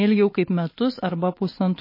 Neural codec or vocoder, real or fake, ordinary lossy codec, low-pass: none; real; MP3, 24 kbps; 5.4 kHz